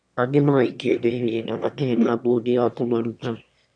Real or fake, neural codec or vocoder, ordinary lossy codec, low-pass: fake; autoencoder, 22.05 kHz, a latent of 192 numbers a frame, VITS, trained on one speaker; MP3, 96 kbps; 9.9 kHz